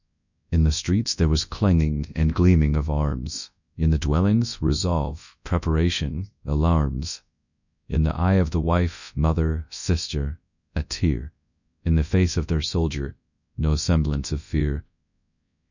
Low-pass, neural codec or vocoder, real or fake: 7.2 kHz; codec, 24 kHz, 0.9 kbps, WavTokenizer, large speech release; fake